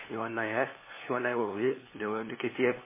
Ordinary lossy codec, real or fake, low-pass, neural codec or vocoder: MP3, 16 kbps; fake; 3.6 kHz; codec, 16 kHz, 2 kbps, FunCodec, trained on LibriTTS, 25 frames a second